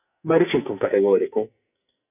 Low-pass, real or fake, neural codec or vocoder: 3.6 kHz; fake; codec, 44.1 kHz, 2.6 kbps, SNAC